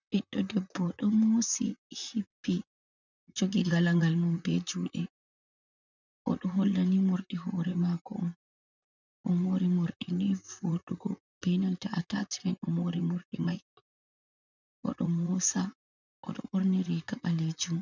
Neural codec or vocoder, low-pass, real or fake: vocoder, 44.1 kHz, 128 mel bands, Pupu-Vocoder; 7.2 kHz; fake